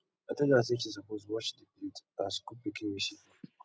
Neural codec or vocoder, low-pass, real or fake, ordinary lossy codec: none; none; real; none